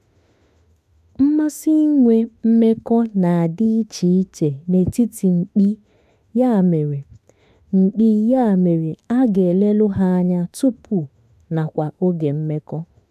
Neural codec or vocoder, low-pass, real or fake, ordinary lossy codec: autoencoder, 48 kHz, 32 numbers a frame, DAC-VAE, trained on Japanese speech; 14.4 kHz; fake; none